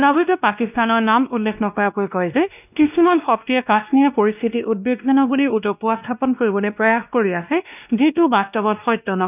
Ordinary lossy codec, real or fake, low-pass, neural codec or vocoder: none; fake; 3.6 kHz; codec, 16 kHz, 1 kbps, X-Codec, WavLM features, trained on Multilingual LibriSpeech